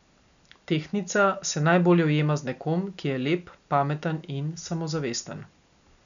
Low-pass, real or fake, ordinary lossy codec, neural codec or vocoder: 7.2 kHz; real; none; none